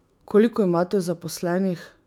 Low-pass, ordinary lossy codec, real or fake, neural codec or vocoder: 19.8 kHz; none; fake; autoencoder, 48 kHz, 128 numbers a frame, DAC-VAE, trained on Japanese speech